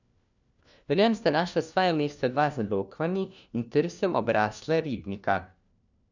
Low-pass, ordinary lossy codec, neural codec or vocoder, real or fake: 7.2 kHz; none; codec, 16 kHz, 1 kbps, FunCodec, trained on LibriTTS, 50 frames a second; fake